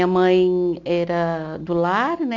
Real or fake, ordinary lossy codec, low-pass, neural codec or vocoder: real; none; 7.2 kHz; none